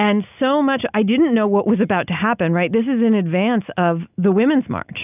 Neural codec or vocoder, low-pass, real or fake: none; 3.6 kHz; real